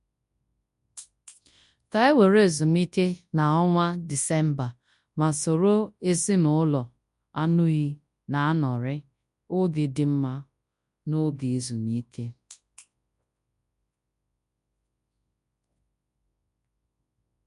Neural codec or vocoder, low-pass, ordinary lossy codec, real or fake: codec, 24 kHz, 0.9 kbps, WavTokenizer, large speech release; 10.8 kHz; MP3, 64 kbps; fake